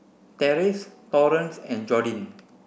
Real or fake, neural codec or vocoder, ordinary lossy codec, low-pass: real; none; none; none